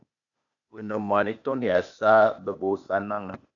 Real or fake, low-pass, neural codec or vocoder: fake; 7.2 kHz; codec, 16 kHz, 0.8 kbps, ZipCodec